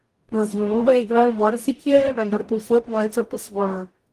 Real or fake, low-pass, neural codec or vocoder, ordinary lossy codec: fake; 14.4 kHz; codec, 44.1 kHz, 0.9 kbps, DAC; Opus, 24 kbps